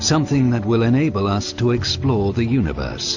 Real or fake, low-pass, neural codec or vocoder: real; 7.2 kHz; none